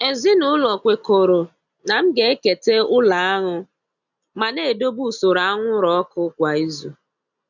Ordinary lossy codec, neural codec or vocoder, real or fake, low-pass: none; none; real; 7.2 kHz